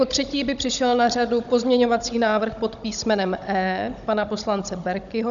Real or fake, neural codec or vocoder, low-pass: fake; codec, 16 kHz, 16 kbps, FunCodec, trained on Chinese and English, 50 frames a second; 7.2 kHz